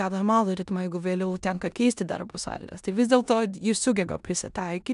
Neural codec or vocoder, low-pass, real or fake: codec, 16 kHz in and 24 kHz out, 0.9 kbps, LongCat-Audio-Codec, four codebook decoder; 10.8 kHz; fake